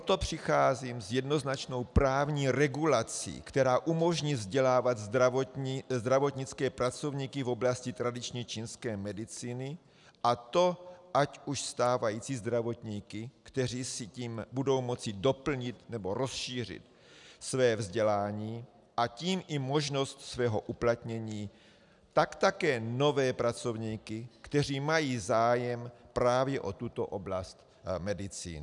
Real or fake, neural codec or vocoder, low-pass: real; none; 10.8 kHz